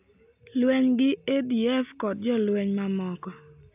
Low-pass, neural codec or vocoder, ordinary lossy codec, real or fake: 3.6 kHz; none; AAC, 32 kbps; real